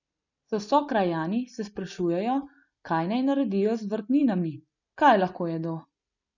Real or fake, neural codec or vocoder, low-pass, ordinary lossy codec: real; none; 7.2 kHz; none